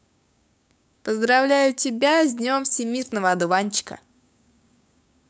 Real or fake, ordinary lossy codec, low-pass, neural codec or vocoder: fake; none; none; codec, 16 kHz, 6 kbps, DAC